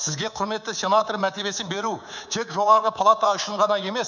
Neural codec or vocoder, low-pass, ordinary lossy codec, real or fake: codec, 24 kHz, 3.1 kbps, DualCodec; 7.2 kHz; none; fake